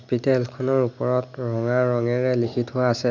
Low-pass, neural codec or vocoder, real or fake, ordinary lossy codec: 7.2 kHz; none; real; none